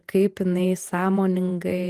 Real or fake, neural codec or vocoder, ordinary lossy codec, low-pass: fake; vocoder, 48 kHz, 128 mel bands, Vocos; Opus, 24 kbps; 14.4 kHz